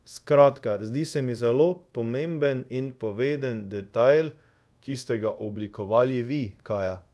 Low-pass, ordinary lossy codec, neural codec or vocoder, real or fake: none; none; codec, 24 kHz, 0.5 kbps, DualCodec; fake